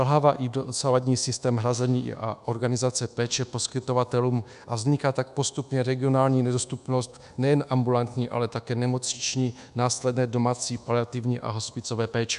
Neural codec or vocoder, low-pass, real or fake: codec, 24 kHz, 1.2 kbps, DualCodec; 10.8 kHz; fake